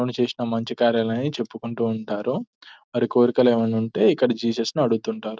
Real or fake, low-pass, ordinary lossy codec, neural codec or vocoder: real; none; none; none